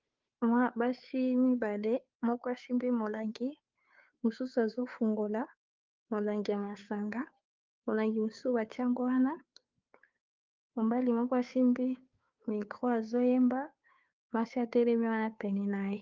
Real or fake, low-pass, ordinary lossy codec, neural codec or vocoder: fake; 7.2 kHz; Opus, 32 kbps; codec, 16 kHz, 2 kbps, FunCodec, trained on Chinese and English, 25 frames a second